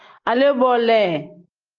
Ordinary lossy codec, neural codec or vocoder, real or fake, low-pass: Opus, 24 kbps; none; real; 7.2 kHz